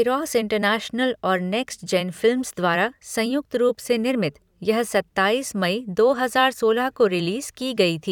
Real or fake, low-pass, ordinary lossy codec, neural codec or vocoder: fake; 19.8 kHz; none; vocoder, 44.1 kHz, 128 mel bands every 256 samples, BigVGAN v2